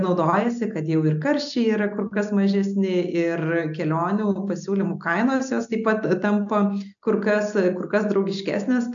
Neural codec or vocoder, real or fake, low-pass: none; real; 7.2 kHz